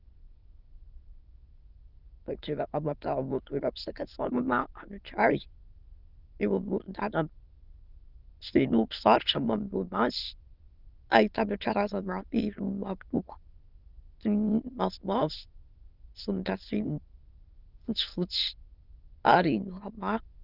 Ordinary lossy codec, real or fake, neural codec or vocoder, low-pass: Opus, 32 kbps; fake; autoencoder, 22.05 kHz, a latent of 192 numbers a frame, VITS, trained on many speakers; 5.4 kHz